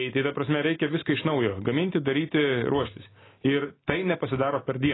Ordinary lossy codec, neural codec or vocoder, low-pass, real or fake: AAC, 16 kbps; none; 7.2 kHz; real